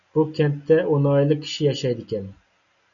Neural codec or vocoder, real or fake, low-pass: none; real; 7.2 kHz